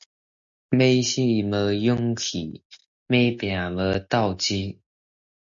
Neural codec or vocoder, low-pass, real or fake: none; 7.2 kHz; real